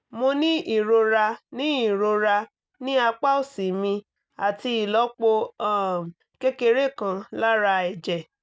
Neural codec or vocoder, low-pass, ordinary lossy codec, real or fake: none; none; none; real